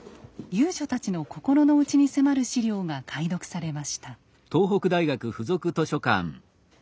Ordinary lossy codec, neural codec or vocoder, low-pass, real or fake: none; none; none; real